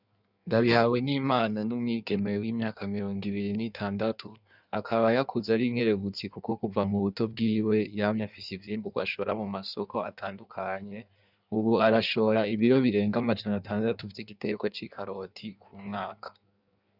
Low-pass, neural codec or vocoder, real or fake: 5.4 kHz; codec, 16 kHz in and 24 kHz out, 1.1 kbps, FireRedTTS-2 codec; fake